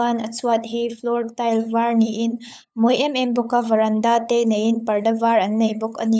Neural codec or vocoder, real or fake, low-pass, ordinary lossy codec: codec, 16 kHz, 8 kbps, FunCodec, trained on LibriTTS, 25 frames a second; fake; none; none